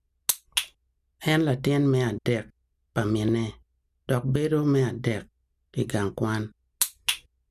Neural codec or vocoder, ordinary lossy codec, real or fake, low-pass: none; none; real; 14.4 kHz